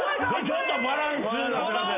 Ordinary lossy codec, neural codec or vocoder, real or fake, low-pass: none; none; real; 3.6 kHz